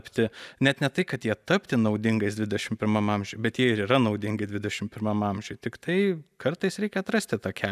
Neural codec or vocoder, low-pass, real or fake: none; 14.4 kHz; real